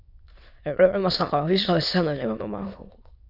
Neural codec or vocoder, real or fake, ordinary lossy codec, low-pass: autoencoder, 22.05 kHz, a latent of 192 numbers a frame, VITS, trained on many speakers; fake; Opus, 64 kbps; 5.4 kHz